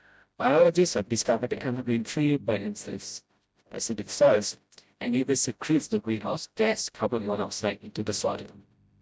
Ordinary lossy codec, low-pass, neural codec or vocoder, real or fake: none; none; codec, 16 kHz, 0.5 kbps, FreqCodec, smaller model; fake